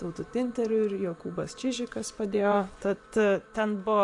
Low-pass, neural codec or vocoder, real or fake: 10.8 kHz; vocoder, 24 kHz, 100 mel bands, Vocos; fake